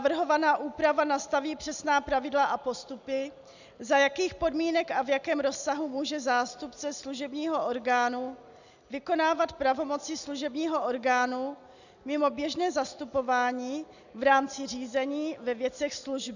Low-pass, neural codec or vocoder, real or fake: 7.2 kHz; none; real